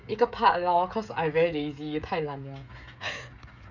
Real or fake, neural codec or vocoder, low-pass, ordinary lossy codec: fake; codec, 16 kHz, 16 kbps, FreqCodec, smaller model; 7.2 kHz; none